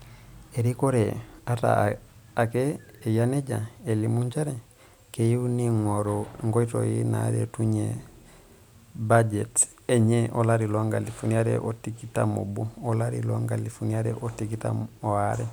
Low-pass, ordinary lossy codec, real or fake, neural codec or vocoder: none; none; real; none